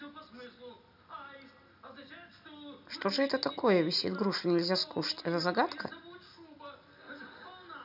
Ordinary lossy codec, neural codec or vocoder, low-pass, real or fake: none; none; 5.4 kHz; real